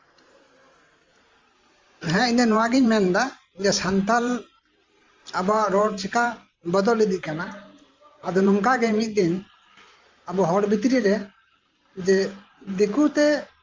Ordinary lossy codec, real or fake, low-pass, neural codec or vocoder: Opus, 32 kbps; fake; 7.2 kHz; vocoder, 44.1 kHz, 128 mel bands every 512 samples, BigVGAN v2